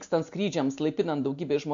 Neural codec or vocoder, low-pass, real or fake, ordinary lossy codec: none; 7.2 kHz; real; AAC, 64 kbps